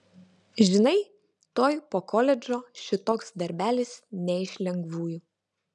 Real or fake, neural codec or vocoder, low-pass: real; none; 10.8 kHz